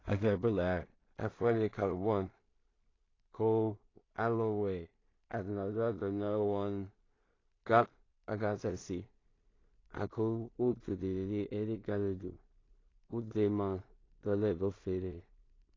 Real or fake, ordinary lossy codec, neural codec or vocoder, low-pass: fake; AAC, 32 kbps; codec, 16 kHz in and 24 kHz out, 0.4 kbps, LongCat-Audio-Codec, two codebook decoder; 7.2 kHz